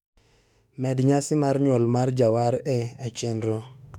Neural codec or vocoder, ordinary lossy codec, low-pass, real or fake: autoencoder, 48 kHz, 32 numbers a frame, DAC-VAE, trained on Japanese speech; none; 19.8 kHz; fake